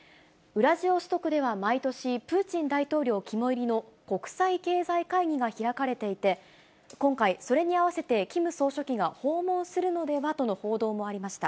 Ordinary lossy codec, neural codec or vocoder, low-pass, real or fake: none; none; none; real